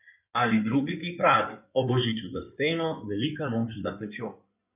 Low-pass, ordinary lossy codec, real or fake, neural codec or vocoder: 3.6 kHz; none; fake; codec, 16 kHz in and 24 kHz out, 2.2 kbps, FireRedTTS-2 codec